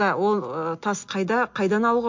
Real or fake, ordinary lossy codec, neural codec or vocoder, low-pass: real; MP3, 48 kbps; none; 7.2 kHz